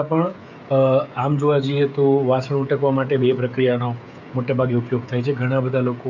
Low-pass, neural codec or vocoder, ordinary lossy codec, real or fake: 7.2 kHz; codec, 16 kHz, 16 kbps, FreqCodec, smaller model; none; fake